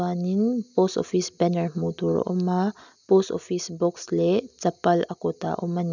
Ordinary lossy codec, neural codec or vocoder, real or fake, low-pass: none; none; real; 7.2 kHz